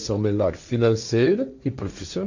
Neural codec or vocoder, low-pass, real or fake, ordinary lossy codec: codec, 16 kHz, 1.1 kbps, Voila-Tokenizer; 7.2 kHz; fake; none